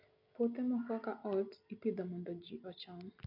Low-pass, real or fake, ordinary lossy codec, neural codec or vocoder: 5.4 kHz; real; none; none